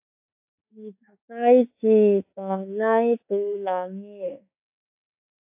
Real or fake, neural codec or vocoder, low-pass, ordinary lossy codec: fake; autoencoder, 48 kHz, 32 numbers a frame, DAC-VAE, trained on Japanese speech; 3.6 kHz; AAC, 32 kbps